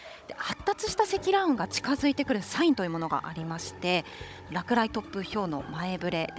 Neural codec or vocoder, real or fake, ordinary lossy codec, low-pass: codec, 16 kHz, 16 kbps, FunCodec, trained on Chinese and English, 50 frames a second; fake; none; none